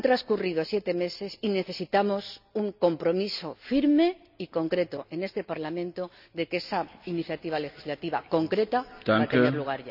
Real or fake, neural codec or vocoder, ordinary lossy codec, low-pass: real; none; none; 5.4 kHz